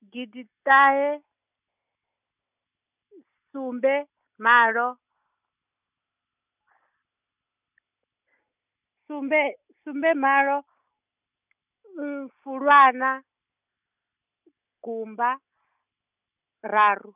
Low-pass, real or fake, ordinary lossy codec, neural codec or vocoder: 3.6 kHz; real; none; none